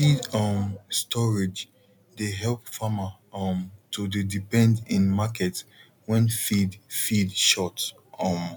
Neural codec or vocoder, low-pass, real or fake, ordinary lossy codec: none; none; real; none